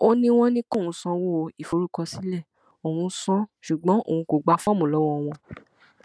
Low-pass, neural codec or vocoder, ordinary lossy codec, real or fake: none; none; none; real